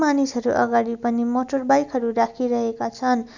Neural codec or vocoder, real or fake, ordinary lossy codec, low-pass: none; real; none; 7.2 kHz